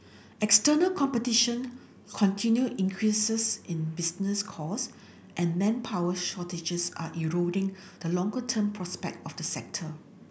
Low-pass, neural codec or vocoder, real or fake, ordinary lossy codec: none; none; real; none